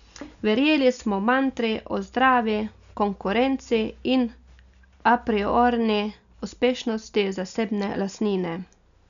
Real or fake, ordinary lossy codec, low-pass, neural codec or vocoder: real; none; 7.2 kHz; none